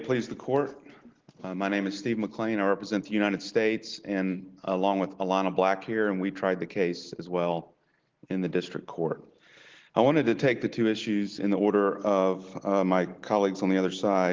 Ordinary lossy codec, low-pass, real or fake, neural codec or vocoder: Opus, 16 kbps; 7.2 kHz; real; none